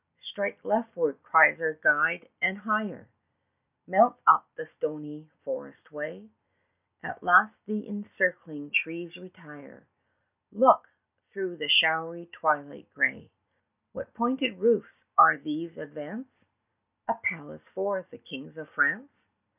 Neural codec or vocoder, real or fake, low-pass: none; real; 3.6 kHz